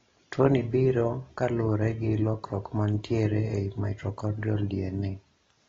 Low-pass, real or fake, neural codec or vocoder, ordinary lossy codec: 7.2 kHz; real; none; AAC, 24 kbps